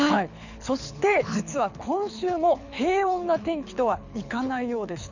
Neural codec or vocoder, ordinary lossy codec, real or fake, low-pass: codec, 24 kHz, 6 kbps, HILCodec; none; fake; 7.2 kHz